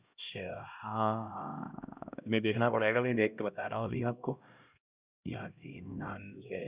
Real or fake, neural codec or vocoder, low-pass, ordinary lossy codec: fake; codec, 16 kHz, 1 kbps, X-Codec, HuBERT features, trained on LibriSpeech; 3.6 kHz; Opus, 64 kbps